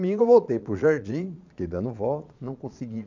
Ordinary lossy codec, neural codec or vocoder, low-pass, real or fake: none; vocoder, 22.05 kHz, 80 mel bands, Vocos; 7.2 kHz; fake